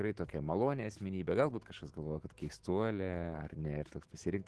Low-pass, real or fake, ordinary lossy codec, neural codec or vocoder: 10.8 kHz; fake; Opus, 16 kbps; autoencoder, 48 kHz, 128 numbers a frame, DAC-VAE, trained on Japanese speech